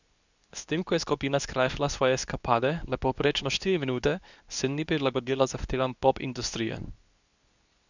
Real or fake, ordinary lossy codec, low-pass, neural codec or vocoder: fake; none; 7.2 kHz; codec, 24 kHz, 0.9 kbps, WavTokenizer, medium speech release version 2